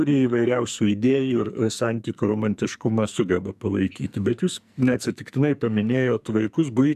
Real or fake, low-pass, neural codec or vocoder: fake; 14.4 kHz; codec, 44.1 kHz, 2.6 kbps, SNAC